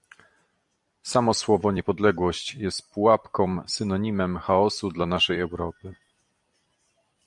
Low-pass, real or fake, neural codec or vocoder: 10.8 kHz; fake; vocoder, 44.1 kHz, 128 mel bands every 256 samples, BigVGAN v2